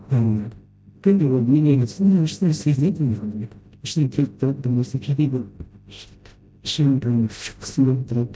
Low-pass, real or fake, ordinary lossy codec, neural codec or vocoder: none; fake; none; codec, 16 kHz, 0.5 kbps, FreqCodec, smaller model